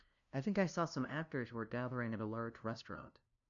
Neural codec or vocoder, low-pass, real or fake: codec, 16 kHz, 0.5 kbps, FunCodec, trained on LibriTTS, 25 frames a second; 7.2 kHz; fake